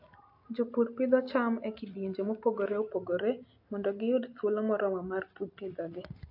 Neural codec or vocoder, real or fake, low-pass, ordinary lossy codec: none; real; 5.4 kHz; AAC, 48 kbps